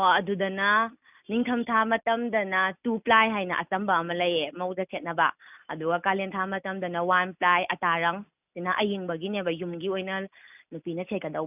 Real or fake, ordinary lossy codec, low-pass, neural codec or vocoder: real; none; 3.6 kHz; none